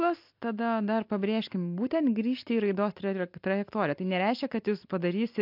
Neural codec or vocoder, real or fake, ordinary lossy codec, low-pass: none; real; MP3, 48 kbps; 5.4 kHz